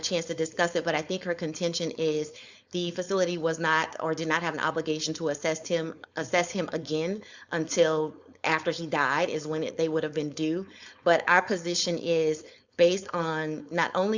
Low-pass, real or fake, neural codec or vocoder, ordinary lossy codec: 7.2 kHz; fake; codec, 16 kHz, 4.8 kbps, FACodec; Opus, 64 kbps